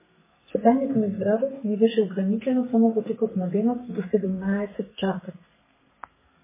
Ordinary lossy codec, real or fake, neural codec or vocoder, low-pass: MP3, 16 kbps; fake; codec, 32 kHz, 1.9 kbps, SNAC; 3.6 kHz